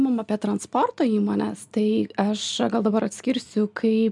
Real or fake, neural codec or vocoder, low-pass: real; none; 10.8 kHz